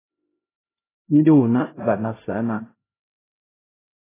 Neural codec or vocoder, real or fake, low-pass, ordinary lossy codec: codec, 16 kHz, 0.5 kbps, X-Codec, HuBERT features, trained on LibriSpeech; fake; 3.6 kHz; AAC, 16 kbps